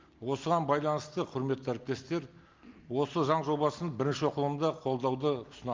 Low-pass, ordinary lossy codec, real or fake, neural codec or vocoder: 7.2 kHz; Opus, 32 kbps; real; none